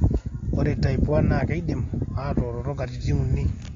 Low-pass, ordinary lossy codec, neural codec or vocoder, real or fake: 7.2 kHz; AAC, 32 kbps; none; real